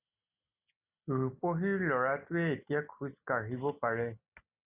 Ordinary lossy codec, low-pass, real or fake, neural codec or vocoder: Opus, 64 kbps; 3.6 kHz; real; none